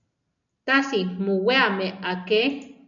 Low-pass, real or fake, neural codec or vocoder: 7.2 kHz; real; none